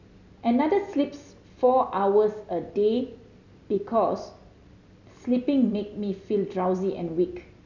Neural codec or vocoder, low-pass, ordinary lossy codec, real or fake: none; 7.2 kHz; none; real